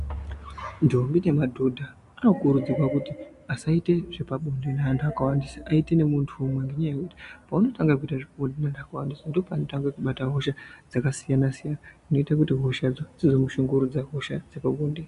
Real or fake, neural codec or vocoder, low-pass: real; none; 10.8 kHz